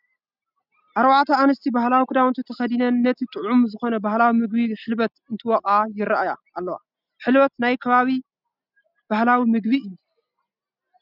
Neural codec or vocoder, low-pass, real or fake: none; 5.4 kHz; real